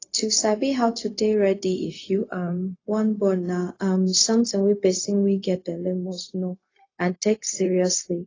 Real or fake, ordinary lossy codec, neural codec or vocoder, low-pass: fake; AAC, 32 kbps; codec, 16 kHz, 0.4 kbps, LongCat-Audio-Codec; 7.2 kHz